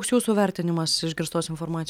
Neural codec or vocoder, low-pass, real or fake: none; 19.8 kHz; real